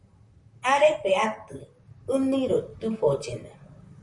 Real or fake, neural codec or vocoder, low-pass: fake; vocoder, 44.1 kHz, 128 mel bands, Pupu-Vocoder; 10.8 kHz